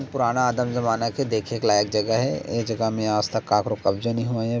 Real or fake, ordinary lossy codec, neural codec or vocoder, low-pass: real; none; none; none